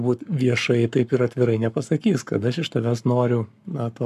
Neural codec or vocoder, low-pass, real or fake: codec, 44.1 kHz, 7.8 kbps, Pupu-Codec; 14.4 kHz; fake